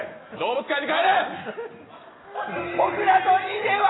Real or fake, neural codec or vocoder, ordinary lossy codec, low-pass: real; none; AAC, 16 kbps; 7.2 kHz